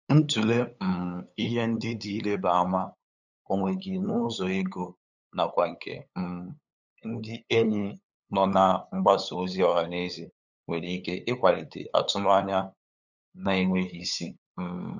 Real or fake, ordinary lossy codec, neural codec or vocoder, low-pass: fake; none; codec, 16 kHz, 8 kbps, FunCodec, trained on LibriTTS, 25 frames a second; 7.2 kHz